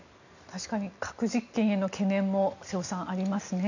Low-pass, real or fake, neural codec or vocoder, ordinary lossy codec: 7.2 kHz; real; none; none